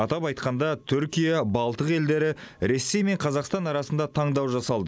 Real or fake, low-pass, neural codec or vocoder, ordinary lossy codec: real; none; none; none